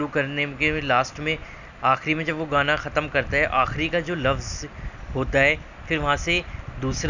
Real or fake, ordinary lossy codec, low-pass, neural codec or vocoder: real; none; 7.2 kHz; none